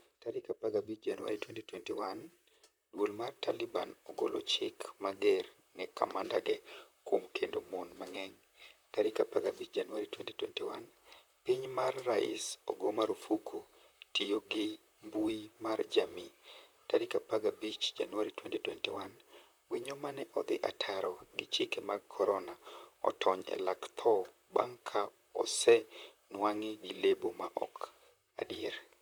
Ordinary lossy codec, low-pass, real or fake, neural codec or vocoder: none; none; fake; vocoder, 44.1 kHz, 128 mel bands, Pupu-Vocoder